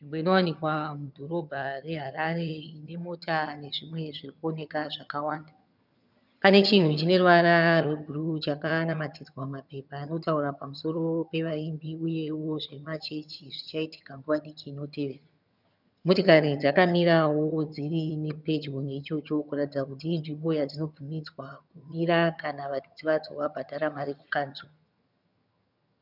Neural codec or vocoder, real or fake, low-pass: vocoder, 22.05 kHz, 80 mel bands, HiFi-GAN; fake; 5.4 kHz